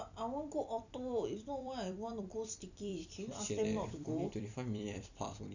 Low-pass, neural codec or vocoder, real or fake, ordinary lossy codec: 7.2 kHz; vocoder, 44.1 kHz, 128 mel bands every 256 samples, BigVGAN v2; fake; none